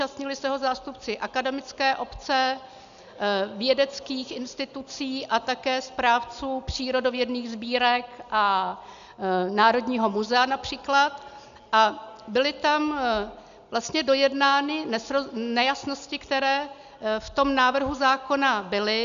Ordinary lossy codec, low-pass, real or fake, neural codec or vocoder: MP3, 96 kbps; 7.2 kHz; real; none